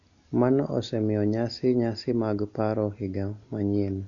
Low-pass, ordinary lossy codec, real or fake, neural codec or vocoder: 7.2 kHz; none; real; none